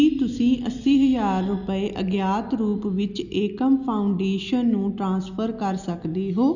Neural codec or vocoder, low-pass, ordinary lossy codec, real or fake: none; 7.2 kHz; none; real